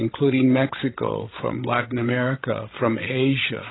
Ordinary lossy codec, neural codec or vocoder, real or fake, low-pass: AAC, 16 kbps; codec, 16 kHz, 16 kbps, FreqCodec, larger model; fake; 7.2 kHz